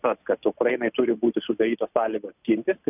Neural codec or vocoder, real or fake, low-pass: none; real; 3.6 kHz